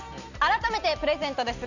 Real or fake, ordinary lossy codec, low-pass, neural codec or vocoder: real; none; 7.2 kHz; none